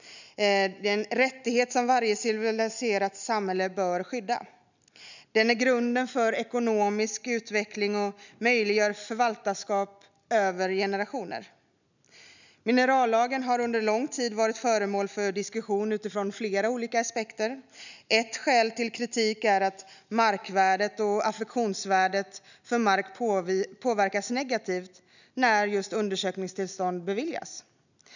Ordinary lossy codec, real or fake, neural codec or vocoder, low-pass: none; real; none; 7.2 kHz